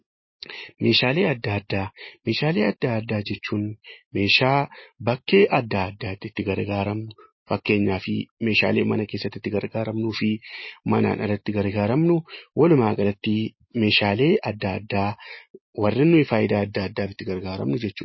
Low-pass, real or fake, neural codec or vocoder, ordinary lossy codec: 7.2 kHz; real; none; MP3, 24 kbps